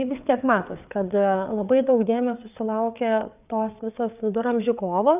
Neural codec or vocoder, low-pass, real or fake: codec, 16 kHz, 4 kbps, FreqCodec, larger model; 3.6 kHz; fake